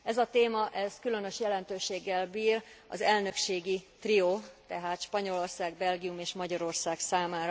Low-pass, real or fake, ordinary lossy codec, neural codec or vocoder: none; real; none; none